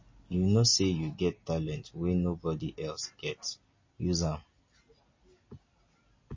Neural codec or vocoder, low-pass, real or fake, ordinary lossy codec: none; 7.2 kHz; real; MP3, 32 kbps